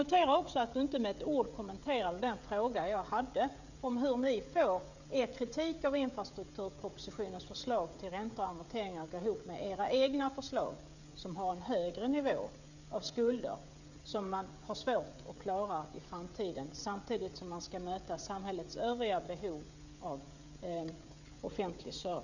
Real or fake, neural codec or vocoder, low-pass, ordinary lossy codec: fake; codec, 16 kHz, 16 kbps, FreqCodec, smaller model; 7.2 kHz; none